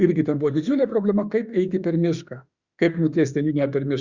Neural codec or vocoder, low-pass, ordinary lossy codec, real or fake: autoencoder, 48 kHz, 32 numbers a frame, DAC-VAE, trained on Japanese speech; 7.2 kHz; Opus, 64 kbps; fake